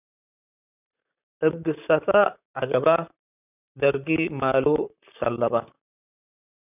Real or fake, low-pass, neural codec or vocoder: fake; 3.6 kHz; vocoder, 44.1 kHz, 128 mel bands, Pupu-Vocoder